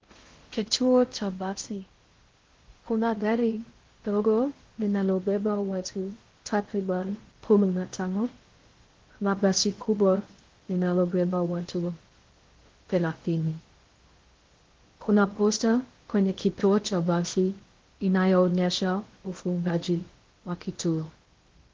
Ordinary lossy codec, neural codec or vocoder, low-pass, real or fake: Opus, 16 kbps; codec, 16 kHz in and 24 kHz out, 0.6 kbps, FocalCodec, streaming, 4096 codes; 7.2 kHz; fake